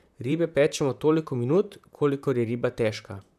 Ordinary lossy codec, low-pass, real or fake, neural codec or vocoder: none; 14.4 kHz; fake; vocoder, 44.1 kHz, 128 mel bands, Pupu-Vocoder